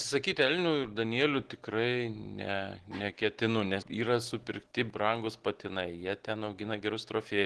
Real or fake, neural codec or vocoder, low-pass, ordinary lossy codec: real; none; 10.8 kHz; Opus, 16 kbps